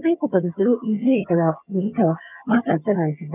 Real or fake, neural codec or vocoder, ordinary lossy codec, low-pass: fake; vocoder, 22.05 kHz, 80 mel bands, HiFi-GAN; none; 3.6 kHz